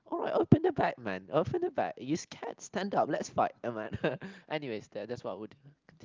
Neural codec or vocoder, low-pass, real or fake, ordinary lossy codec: none; 7.2 kHz; real; Opus, 24 kbps